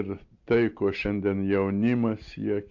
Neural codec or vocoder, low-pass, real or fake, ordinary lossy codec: none; 7.2 kHz; real; MP3, 64 kbps